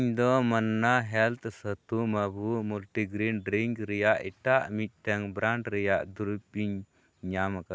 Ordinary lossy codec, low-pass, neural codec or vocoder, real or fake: none; none; none; real